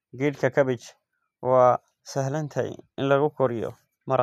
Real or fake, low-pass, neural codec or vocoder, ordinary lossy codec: real; 14.4 kHz; none; none